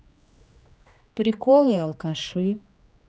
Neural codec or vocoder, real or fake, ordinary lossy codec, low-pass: codec, 16 kHz, 2 kbps, X-Codec, HuBERT features, trained on general audio; fake; none; none